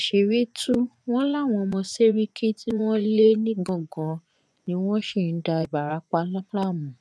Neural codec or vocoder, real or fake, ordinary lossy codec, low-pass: vocoder, 24 kHz, 100 mel bands, Vocos; fake; none; none